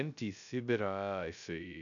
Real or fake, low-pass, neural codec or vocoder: fake; 7.2 kHz; codec, 16 kHz, 0.2 kbps, FocalCodec